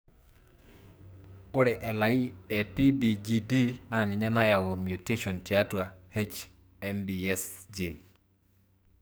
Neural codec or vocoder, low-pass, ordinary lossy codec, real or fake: codec, 44.1 kHz, 2.6 kbps, SNAC; none; none; fake